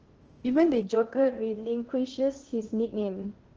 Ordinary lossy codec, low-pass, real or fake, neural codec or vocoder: Opus, 16 kbps; 7.2 kHz; fake; codec, 16 kHz in and 24 kHz out, 0.8 kbps, FocalCodec, streaming, 65536 codes